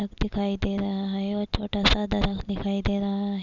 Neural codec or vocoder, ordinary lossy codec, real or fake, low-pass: none; Opus, 64 kbps; real; 7.2 kHz